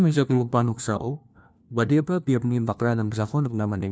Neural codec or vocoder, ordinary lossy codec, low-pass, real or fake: codec, 16 kHz, 1 kbps, FunCodec, trained on LibriTTS, 50 frames a second; none; none; fake